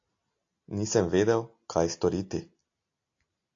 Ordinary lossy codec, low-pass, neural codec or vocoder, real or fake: AAC, 48 kbps; 7.2 kHz; none; real